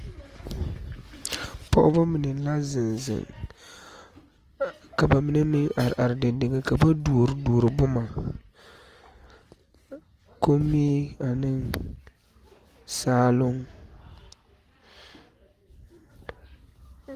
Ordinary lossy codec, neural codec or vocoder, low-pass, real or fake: Opus, 24 kbps; none; 14.4 kHz; real